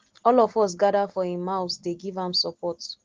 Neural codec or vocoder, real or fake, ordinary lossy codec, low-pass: none; real; Opus, 16 kbps; 7.2 kHz